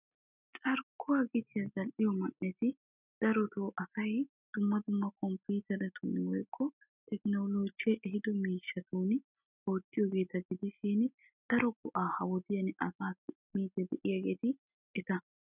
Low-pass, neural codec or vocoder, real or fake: 3.6 kHz; none; real